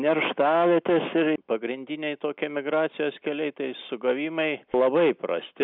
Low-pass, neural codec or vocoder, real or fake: 5.4 kHz; none; real